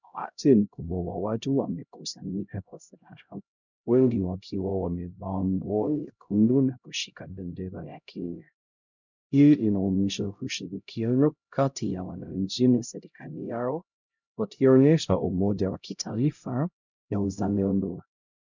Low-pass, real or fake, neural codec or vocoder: 7.2 kHz; fake; codec, 16 kHz, 0.5 kbps, X-Codec, HuBERT features, trained on LibriSpeech